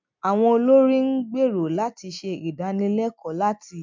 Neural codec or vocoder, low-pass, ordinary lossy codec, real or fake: none; 7.2 kHz; MP3, 64 kbps; real